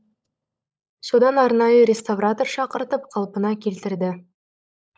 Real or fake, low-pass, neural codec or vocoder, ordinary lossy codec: fake; none; codec, 16 kHz, 16 kbps, FunCodec, trained on LibriTTS, 50 frames a second; none